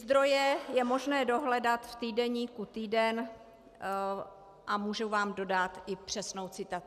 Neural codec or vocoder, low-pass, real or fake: none; 14.4 kHz; real